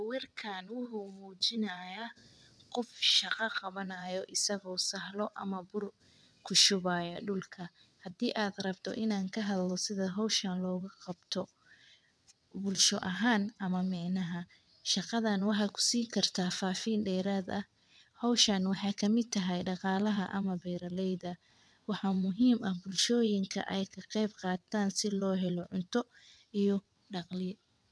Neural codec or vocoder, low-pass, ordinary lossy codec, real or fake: vocoder, 22.05 kHz, 80 mel bands, WaveNeXt; none; none; fake